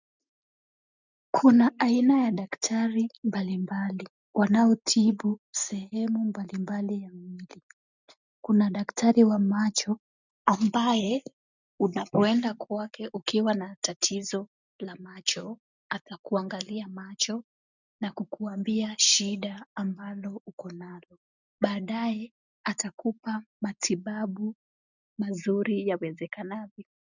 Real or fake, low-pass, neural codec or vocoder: real; 7.2 kHz; none